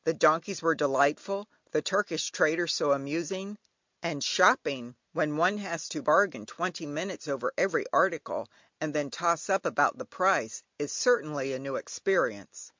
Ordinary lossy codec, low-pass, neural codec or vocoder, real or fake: MP3, 64 kbps; 7.2 kHz; none; real